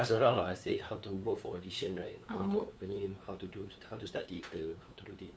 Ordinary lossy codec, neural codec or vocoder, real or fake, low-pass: none; codec, 16 kHz, 2 kbps, FunCodec, trained on LibriTTS, 25 frames a second; fake; none